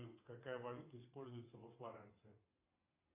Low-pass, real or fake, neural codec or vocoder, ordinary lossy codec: 3.6 kHz; fake; vocoder, 22.05 kHz, 80 mel bands, WaveNeXt; AAC, 24 kbps